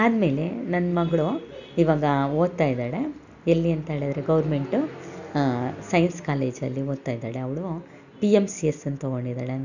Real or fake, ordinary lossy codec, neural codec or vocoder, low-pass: real; none; none; 7.2 kHz